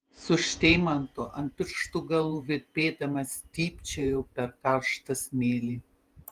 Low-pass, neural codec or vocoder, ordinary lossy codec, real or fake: 14.4 kHz; vocoder, 48 kHz, 128 mel bands, Vocos; Opus, 24 kbps; fake